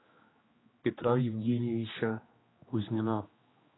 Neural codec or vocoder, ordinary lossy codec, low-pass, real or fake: codec, 16 kHz, 2 kbps, X-Codec, HuBERT features, trained on general audio; AAC, 16 kbps; 7.2 kHz; fake